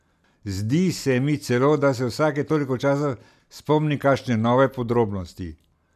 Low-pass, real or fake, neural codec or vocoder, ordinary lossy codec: 14.4 kHz; real; none; none